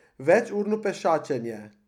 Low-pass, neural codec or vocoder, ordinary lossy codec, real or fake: 19.8 kHz; none; none; real